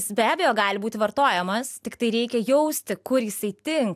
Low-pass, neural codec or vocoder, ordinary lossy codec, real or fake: 14.4 kHz; none; AAC, 96 kbps; real